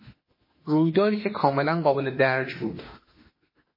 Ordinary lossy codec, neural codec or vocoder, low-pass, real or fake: MP3, 24 kbps; autoencoder, 48 kHz, 32 numbers a frame, DAC-VAE, trained on Japanese speech; 5.4 kHz; fake